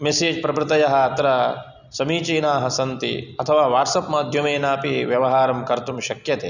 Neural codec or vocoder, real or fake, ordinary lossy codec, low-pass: none; real; none; 7.2 kHz